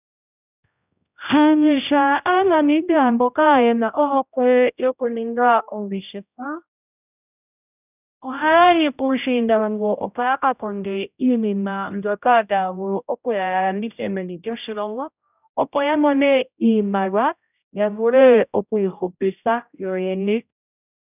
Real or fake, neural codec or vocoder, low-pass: fake; codec, 16 kHz, 0.5 kbps, X-Codec, HuBERT features, trained on general audio; 3.6 kHz